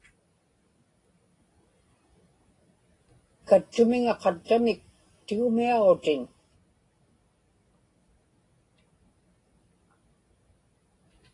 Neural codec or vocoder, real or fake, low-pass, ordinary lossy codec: none; real; 10.8 kHz; AAC, 32 kbps